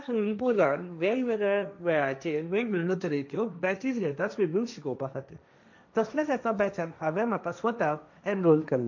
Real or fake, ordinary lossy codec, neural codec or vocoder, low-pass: fake; none; codec, 16 kHz, 1.1 kbps, Voila-Tokenizer; 7.2 kHz